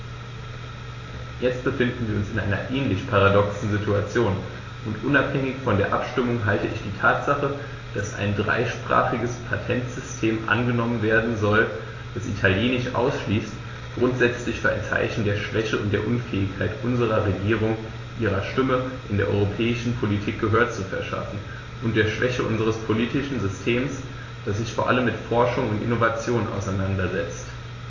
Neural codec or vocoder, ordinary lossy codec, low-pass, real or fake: none; AAC, 32 kbps; 7.2 kHz; real